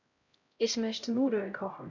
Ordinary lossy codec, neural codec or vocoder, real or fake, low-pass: none; codec, 16 kHz, 0.5 kbps, X-Codec, HuBERT features, trained on LibriSpeech; fake; 7.2 kHz